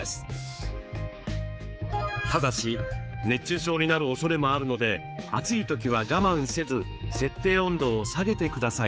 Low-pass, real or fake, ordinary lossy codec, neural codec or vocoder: none; fake; none; codec, 16 kHz, 4 kbps, X-Codec, HuBERT features, trained on general audio